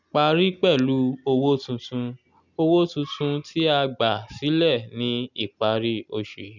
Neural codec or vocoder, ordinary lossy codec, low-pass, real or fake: none; none; 7.2 kHz; real